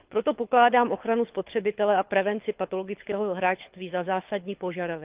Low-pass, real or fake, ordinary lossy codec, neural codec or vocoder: 3.6 kHz; fake; Opus, 24 kbps; codec, 24 kHz, 6 kbps, HILCodec